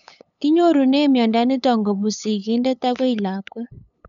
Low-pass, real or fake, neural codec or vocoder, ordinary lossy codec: 7.2 kHz; fake; codec, 16 kHz, 8 kbps, FunCodec, trained on LibriTTS, 25 frames a second; MP3, 96 kbps